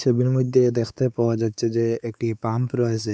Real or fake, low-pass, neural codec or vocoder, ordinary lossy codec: fake; none; codec, 16 kHz, 4 kbps, X-Codec, WavLM features, trained on Multilingual LibriSpeech; none